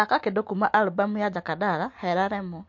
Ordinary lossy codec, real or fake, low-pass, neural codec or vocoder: MP3, 48 kbps; real; 7.2 kHz; none